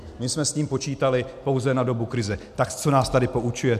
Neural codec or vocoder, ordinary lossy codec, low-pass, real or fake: none; MP3, 96 kbps; 14.4 kHz; real